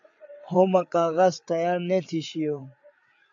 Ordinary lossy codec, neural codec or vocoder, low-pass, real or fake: AAC, 64 kbps; codec, 16 kHz, 8 kbps, FreqCodec, larger model; 7.2 kHz; fake